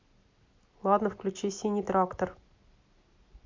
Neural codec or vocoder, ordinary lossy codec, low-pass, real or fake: none; MP3, 48 kbps; 7.2 kHz; real